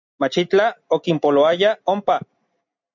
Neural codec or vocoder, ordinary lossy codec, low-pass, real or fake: none; MP3, 64 kbps; 7.2 kHz; real